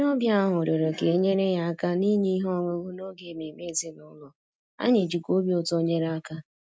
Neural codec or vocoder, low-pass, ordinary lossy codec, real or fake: none; none; none; real